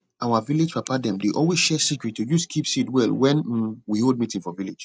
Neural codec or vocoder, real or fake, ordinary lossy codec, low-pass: none; real; none; none